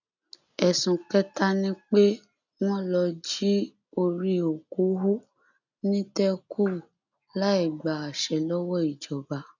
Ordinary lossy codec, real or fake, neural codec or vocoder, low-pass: none; real; none; 7.2 kHz